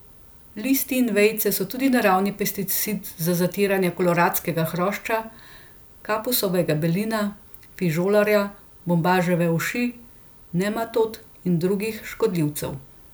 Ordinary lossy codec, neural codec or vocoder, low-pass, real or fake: none; none; none; real